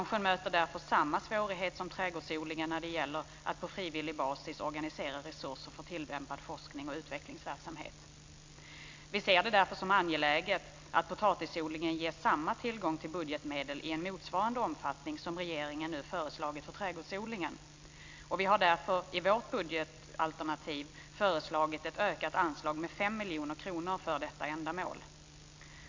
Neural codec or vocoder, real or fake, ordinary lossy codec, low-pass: none; real; MP3, 48 kbps; 7.2 kHz